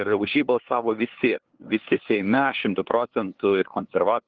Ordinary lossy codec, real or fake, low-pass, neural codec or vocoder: Opus, 16 kbps; fake; 7.2 kHz; codec, 16 kHz, 2 kbps, FunCodec, trained on LibriTTS, 25 frames a second